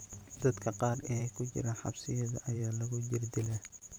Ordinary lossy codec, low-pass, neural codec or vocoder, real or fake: none; none; vocoder, 44.1 kHz, 128 mel bands every 512 samples, BigVGAN v2; fake